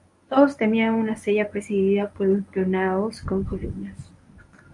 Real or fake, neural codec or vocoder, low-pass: fake; codec, 24 kHz, 0.9 kbps, WavTokenizer, medium speech release version 1; 10.8 kHz